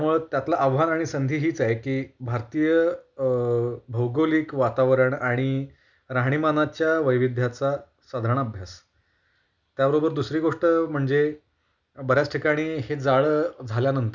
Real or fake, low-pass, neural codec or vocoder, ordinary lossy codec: real; 7.2 kHz; none; none